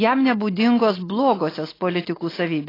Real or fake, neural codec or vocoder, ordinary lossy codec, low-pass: real; none; AAC, 24 kbps; 5.4 kHz